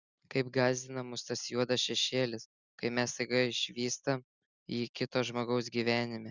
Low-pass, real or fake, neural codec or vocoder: 7.2 kHz; real; none